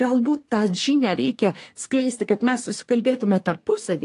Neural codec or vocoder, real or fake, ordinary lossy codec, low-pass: codec, 24 kHz, 1 kbps, SNAC; fake; AAC, 48 kbps; 10.8 kHz